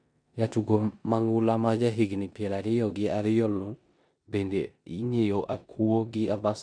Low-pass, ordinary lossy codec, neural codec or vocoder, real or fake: 9.9 kHz; none; codec, 16 kHz in and 24 kHz out, 0.9 kbps, LongCat-Audio-Codec, four codebook decoder; fake